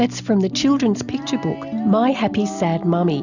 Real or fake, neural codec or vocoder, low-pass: real; none; 7.2 kHz